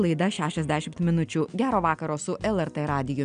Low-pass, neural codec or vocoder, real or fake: 9.9 kHz; none; real